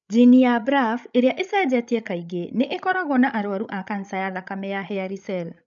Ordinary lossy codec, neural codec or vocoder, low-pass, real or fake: none; codec, 16 kHz, 16 kbps, FreqCodec, larger model; 7.2 kHz; fake